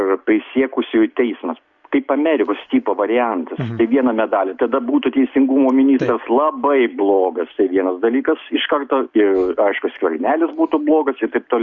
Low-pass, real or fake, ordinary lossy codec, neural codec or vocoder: 7.2 kHz; real; Opus, 64 kbps; none